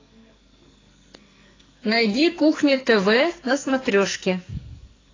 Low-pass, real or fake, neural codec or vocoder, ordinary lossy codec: 7.2 kHz; fake; codec, 44.1 kHz, 2.6 kbps, SNAC; AAC, 32 kbps